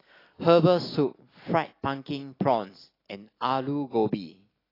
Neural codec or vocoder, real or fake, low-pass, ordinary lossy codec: none; real; 5.4 kHz; AAC, 24 kbps